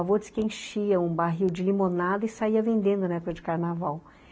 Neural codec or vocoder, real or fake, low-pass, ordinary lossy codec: none; real; none; none